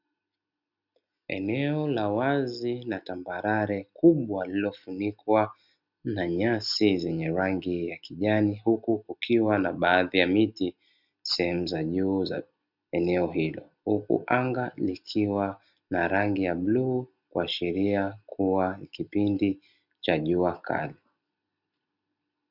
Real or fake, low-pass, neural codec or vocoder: real; 5.4 kHz; none